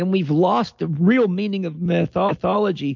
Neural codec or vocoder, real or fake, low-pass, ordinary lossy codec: none; real; 7.2 kHz; MP3, 48 kbps